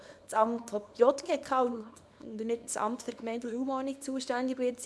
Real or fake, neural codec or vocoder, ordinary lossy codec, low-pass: fake; codec, 24 kHz, 0.9 kbps, WavTokenizer, small release; none; none